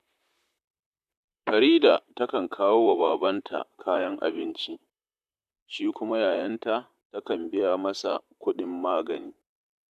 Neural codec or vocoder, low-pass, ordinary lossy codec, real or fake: vocoder, 44.1 kHz, 128 mel bands, Pupu-Vocoder; 14.4 kHz; none; fake